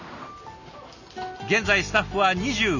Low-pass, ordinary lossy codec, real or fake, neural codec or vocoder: 7.2 kHz; none; real; none